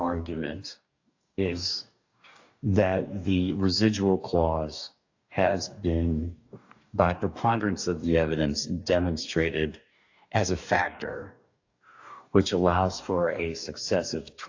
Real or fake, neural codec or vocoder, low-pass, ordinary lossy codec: fake; codec, 44.1 kHz, 2.6 kbps, DAC; 7.2 kHz; AAC, 48 kbps